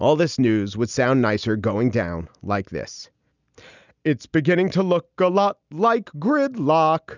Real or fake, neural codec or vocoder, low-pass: real; none; 7.2 kHz